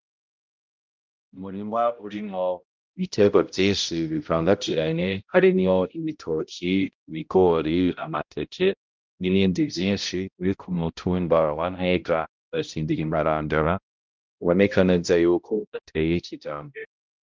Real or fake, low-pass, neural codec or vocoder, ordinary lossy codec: fake; 7.2 kHz; codec, 16 kHz, 0.5 kbps, X-Codec, HuBERT features, trained on balanced general audio; Opus, 32 kbps